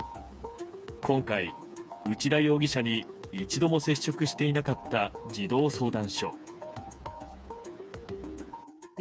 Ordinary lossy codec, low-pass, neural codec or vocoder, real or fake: none; none; codec, 16 kHz, 4 kbps, FreqCodec, smaller model; fake